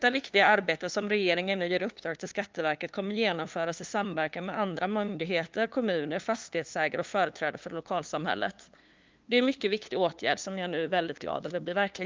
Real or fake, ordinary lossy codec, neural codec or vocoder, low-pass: fake; Opus, 24 kbps; codec, 16 kHz, 2 kbps, FunCodec, trained on LibriTTS, 25 frames a second; 7.2 kHz